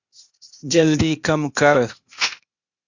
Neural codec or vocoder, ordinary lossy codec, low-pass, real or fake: codec, 16 kHz, 0.8 kbps, ZipCodec; Opus, 64 kbps; 7.2 kHz; fake